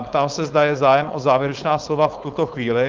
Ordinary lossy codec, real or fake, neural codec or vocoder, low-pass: Opus, 32 kbps; fake; codec, 16 kHz, 4.8 kbps, FACodec; 7.2 kHz